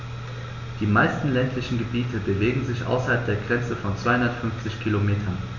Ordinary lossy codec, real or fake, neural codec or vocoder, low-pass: none; real; none; 7.2 kHz